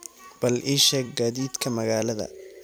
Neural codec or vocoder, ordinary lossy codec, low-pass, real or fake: vocoder, 44.1 kHz, 128 mel bands every 256 samples, BigVGAN v2; none; none; fake